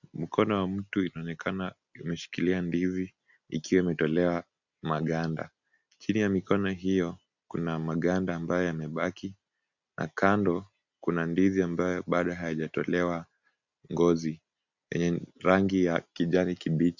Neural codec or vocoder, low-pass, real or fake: none; 7.2 kHz; real